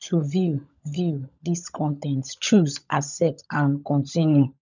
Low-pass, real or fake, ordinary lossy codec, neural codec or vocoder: 7.2 kHz; fake; none; codec, 16 kHz, 16 kbps, FunCodec, trained on LibriTTS, 50 frames a second